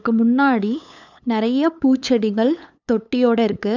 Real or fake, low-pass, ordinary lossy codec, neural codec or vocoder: fake; 7.2 kHz; none; codec, 16 kHz, 8 kbps, FunCodec, trained on LibriTTS, 25 frames a second